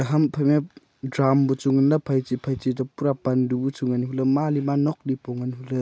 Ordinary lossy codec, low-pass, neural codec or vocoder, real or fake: none; none; none; real